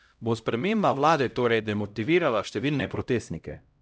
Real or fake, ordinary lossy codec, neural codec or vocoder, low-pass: fake; none; codec, 16 kHz, 0.5 kbps, X-Codec, HuBERT features, trained on LibriSpeech; none